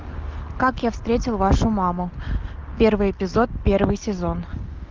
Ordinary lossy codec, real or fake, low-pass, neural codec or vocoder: Opus, 32 kbps; real; 7.2 kHz; none